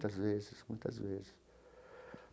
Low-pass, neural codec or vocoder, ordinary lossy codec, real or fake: none; none; none; real